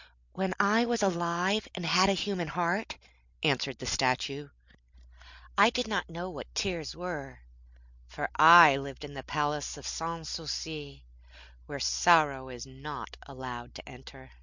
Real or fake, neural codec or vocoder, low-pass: real; none; 7.2 kHz